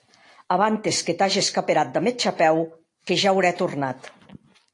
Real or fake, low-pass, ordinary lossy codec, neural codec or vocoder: real; 10.8 kHz; AAC, 48 kbps; none